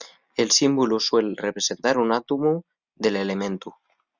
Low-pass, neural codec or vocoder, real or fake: 7.2 kHz; none; real